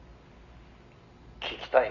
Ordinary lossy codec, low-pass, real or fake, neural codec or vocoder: none; 7.2 kHz; real; none